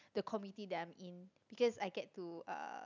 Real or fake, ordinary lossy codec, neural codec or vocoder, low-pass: real; none; none; 7.2 kHz